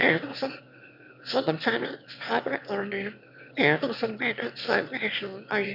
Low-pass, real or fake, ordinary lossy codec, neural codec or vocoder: 5.4 kHz; fake; none; autoencoder, 22.05 kHz, a latent of 192 numbers a frame, VITS, trained on one speaker